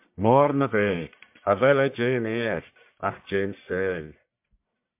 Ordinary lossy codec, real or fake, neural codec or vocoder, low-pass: MP3, 32 kbps; fake; codec, 44.1 kHz, 1.7 kbps, Pupu-Codec; 3.6 kHz